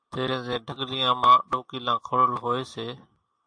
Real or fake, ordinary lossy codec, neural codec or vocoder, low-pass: real; AAC, 64 kbps; none; 9.9 kHz